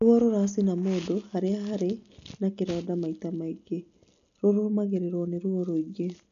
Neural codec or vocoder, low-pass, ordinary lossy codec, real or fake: none; 7.2 kHz; none; real